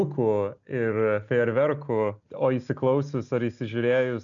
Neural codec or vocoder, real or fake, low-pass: none; real; 7.2 kHz